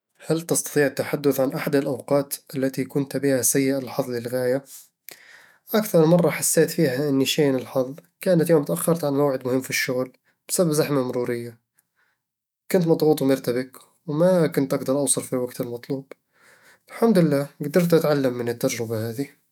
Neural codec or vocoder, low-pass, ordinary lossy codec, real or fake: autoencoder, 48 kHz, 128 numbers a frame, DAC-VAE, trained on Japanese speech; none; none; fake